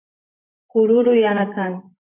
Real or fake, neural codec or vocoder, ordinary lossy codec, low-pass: fake; vocoder, 44.1 kHz, 128 mel bands, Pupu-Vocoder; MP3, 24 kbps; 3.6 kHz